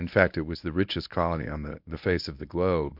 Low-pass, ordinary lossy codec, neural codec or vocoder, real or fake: 5.4 kHz; MP3, 48 kbps; codec, 24 kHz, 0.9 kbps, WavTokenizer, medium speech release version 1; fake